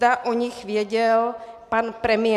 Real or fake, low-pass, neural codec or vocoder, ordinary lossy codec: real; 14.4 kHz; none; MP3, 96 kbps